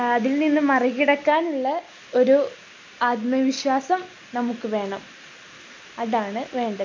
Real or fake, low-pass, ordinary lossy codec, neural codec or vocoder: real; 7.2 kHz; MP3, 48 kbps; none